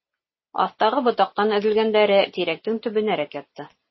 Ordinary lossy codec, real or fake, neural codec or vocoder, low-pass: MP3, 24 kbps; real; none; 7.2 kHz